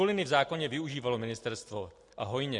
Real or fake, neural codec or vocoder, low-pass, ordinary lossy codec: real; none; 10.8 kHz; MP3, 64 kbps